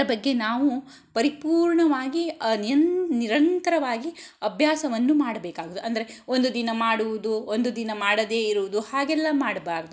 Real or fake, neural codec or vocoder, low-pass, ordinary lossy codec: real; none; none; none